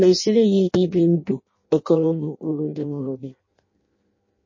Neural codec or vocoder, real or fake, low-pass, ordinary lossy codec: codec, 16 kHz in and 24 kHz out, 0.6 kbps, FireRedTTS-2 codec; fake; 7.2 kHz; MP3, 32 kbps